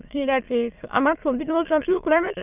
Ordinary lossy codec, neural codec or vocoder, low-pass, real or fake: none; autoencoder, 22.05 kHz, a latent of 192 numbers a frame, VITS, trained on many speakers; 3.6 kHz; fake